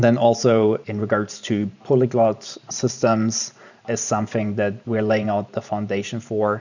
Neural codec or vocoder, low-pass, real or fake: vocoder, 44.1 kHz, 128 mel bands every 512 samples, BigVGAN v2; 7.2 kHz; fake